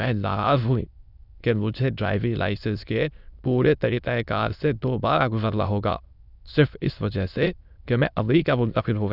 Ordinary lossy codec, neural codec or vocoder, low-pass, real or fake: none; autoencoder, 22.05 kHz, a latent of 192 numbers a frame, VITS, trained on many speakers; 5.4 kHz; fake